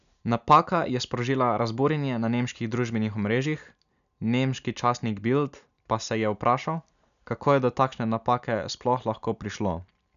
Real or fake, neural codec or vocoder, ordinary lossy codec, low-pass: real; none; none; 7.2 kHz